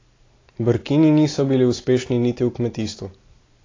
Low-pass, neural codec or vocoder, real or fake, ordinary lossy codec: 7.2 kHz; none; real; AAC, 32 kbps